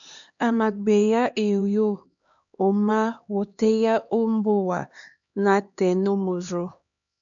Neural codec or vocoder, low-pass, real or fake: codec, 16 kHz, 2 kbps, X-Codec, HuBERT features, trained on LibriSpeech; 7.2 kHz; fake